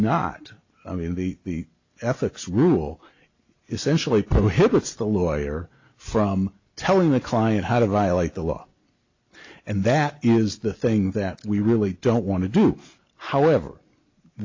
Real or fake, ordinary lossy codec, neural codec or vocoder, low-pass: real; AAC, 48 kbps; none; 7.2 kHz